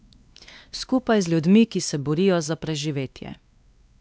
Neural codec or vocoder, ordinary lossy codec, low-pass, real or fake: codec, 16 kHz, 2 kbps, X-Codec, WavLM features, trained on Multilingual LibriSpeech; none; none; fake